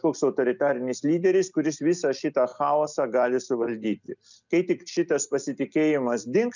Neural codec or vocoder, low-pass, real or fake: none; 7.2 kHz; real